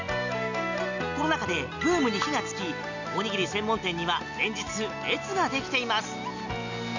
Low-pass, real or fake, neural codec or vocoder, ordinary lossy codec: 7.2 kHz; real; none; none